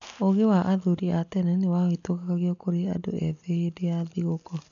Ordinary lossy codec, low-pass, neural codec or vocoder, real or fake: none; 7.2 kHz; none; real